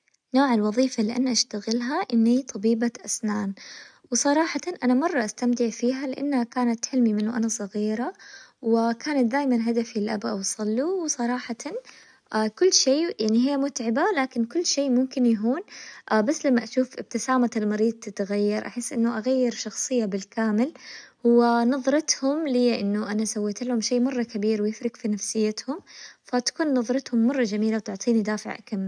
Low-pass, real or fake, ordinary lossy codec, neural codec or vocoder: 9.9 kHz; real; none; none